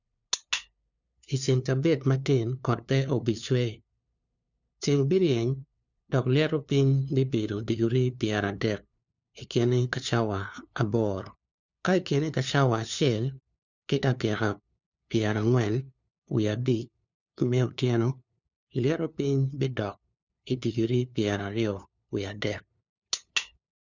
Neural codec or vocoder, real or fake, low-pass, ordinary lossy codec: codec, 16 kHz, 2 kbps, FunCodec, trained on LibriTTS, 25 frames a second; fake; 7.2 kHz; none